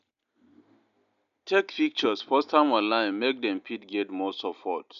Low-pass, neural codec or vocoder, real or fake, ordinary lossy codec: 7.2 kHz; none; real; none